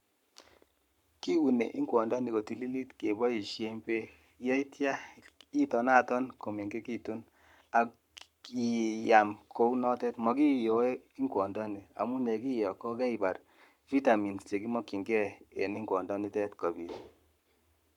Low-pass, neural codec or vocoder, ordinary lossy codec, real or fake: 19.8 kHz; codec, 44.1 kHz, 7.8 kbps, Pupu-Codec; none; fake